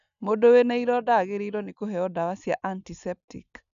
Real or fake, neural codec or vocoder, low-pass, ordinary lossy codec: real; none; 7.2 kHz; none